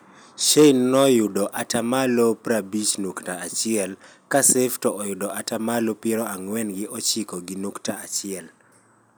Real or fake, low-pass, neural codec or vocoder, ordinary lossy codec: real; none; none; none